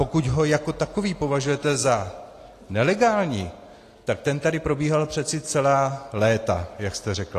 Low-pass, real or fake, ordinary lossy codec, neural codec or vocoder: 14.4 kHz; real; AAC, 48 kbps; none